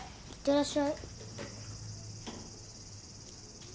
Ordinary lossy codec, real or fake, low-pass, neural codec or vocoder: none; real; none; none